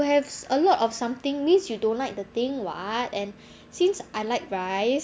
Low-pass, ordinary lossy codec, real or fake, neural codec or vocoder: none; none; real; none